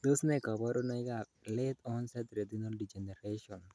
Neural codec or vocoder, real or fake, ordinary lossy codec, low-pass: none; real; none; none